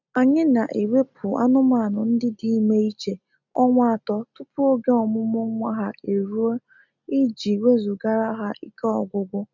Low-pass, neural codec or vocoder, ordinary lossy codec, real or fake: 7.2 kHz; none; none; real